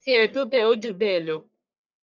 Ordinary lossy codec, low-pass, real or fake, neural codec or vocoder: none; 7.2 kHz; fake; codec, 44.1 kHz, 1.7 kbps, Pupu-Codec